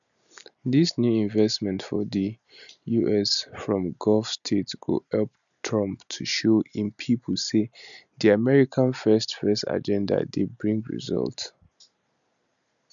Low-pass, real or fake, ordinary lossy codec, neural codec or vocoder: 7.2 kHz; real; none; none